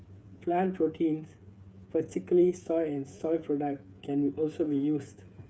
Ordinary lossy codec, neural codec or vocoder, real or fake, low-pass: none; codec, 16 kHz, 8 kbps, FreqCodec, smaller model; fake; none